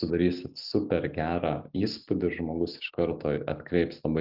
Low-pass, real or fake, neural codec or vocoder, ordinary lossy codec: 5.4 kHz; real; none; Opus, 24 kbps